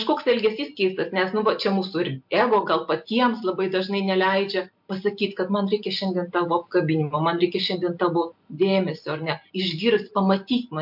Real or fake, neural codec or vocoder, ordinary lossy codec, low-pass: real; none; MP3, 48 kbps; 5.4 kHz